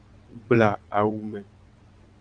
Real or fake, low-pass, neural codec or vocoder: fake; 9.9 kHz; vocoder, 22.05 kHz, 80 mel bands, WaveNeXt